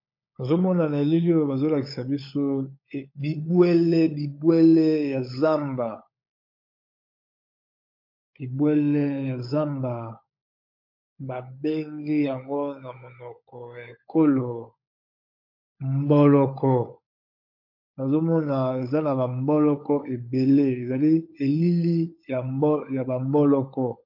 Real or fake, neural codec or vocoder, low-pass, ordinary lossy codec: fake; codec, 16 kHz, 16 kbps, FunCodec, trained on LibriTTS, 50 frames a second; 5.4 kHz; MP3, 32 kbps